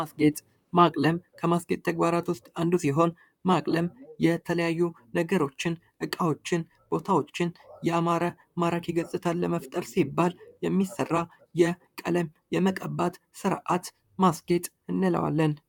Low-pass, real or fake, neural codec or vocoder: 19.8 kHz; fake; vocoder, 44.1 kHz, 128 mel bands, Pupu-Vocoder